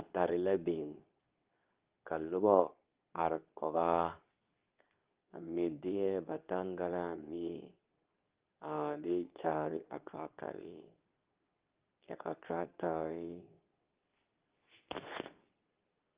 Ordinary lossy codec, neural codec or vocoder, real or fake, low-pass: Opus, 24 kbps; codec, 24 kHz, 0.9 kbps, WavTokenizer, medium speech release version 2; fake; 3.6 kHz